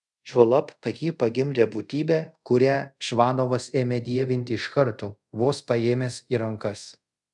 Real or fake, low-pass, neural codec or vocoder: fake; 10.8 kHz; codec, 24 kHz, 0.5 kbps, DualCodec